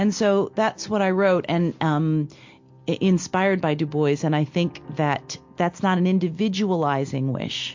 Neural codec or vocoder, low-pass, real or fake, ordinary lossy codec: none; 7.2 kHz; real; MP3, 48 kbps